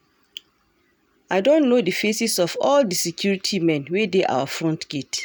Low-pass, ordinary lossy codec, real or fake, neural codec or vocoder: none; none; real; none